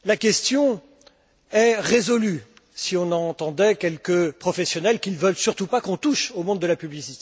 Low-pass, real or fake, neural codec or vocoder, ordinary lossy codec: none; real; none; none